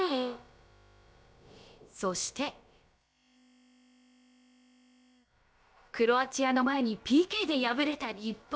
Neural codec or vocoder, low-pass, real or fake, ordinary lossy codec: codec, 16 kHz, about 1 kbps, DyCAST, with the encoder's durations; none; fake; none